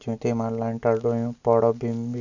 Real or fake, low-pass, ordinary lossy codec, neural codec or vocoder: real; 7.2 kHz; none; none